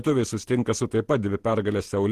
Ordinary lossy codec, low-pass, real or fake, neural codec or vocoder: Opus, 16 kbps; 14.4 kHz; fake; vocoder, 48 kHz, 128 mel bands, Vocos